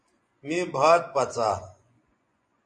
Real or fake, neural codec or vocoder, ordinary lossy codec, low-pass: fake; vocoder, 24 kHz, 100 mel bands, Vocos; MP3, 48 kbps; 9.9 kHz